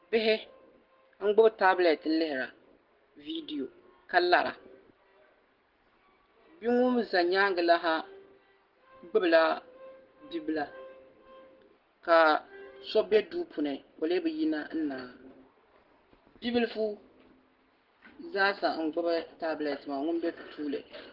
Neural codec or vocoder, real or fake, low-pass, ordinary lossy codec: none; real; 5.4 kHz; Opus, 16 kbps